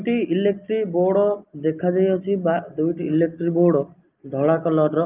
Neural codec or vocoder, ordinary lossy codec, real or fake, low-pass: none; Opus, 32 kbps; real; 3.6 kHz